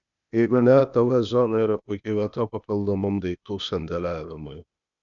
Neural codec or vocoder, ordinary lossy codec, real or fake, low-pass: codec, 16 kHz, 0.8 kbps, ZipCodec; MP3, 96 kbps; fake; 7.2 kHz